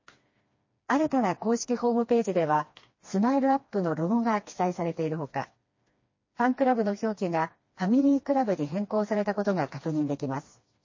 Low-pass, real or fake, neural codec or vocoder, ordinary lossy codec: 7.2 kHz; fake; codec, 16 kHz, 2 kbps, FreqCodec, smaller model; MP3, 32 kbps